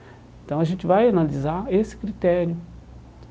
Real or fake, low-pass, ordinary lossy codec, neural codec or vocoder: real; none; none; none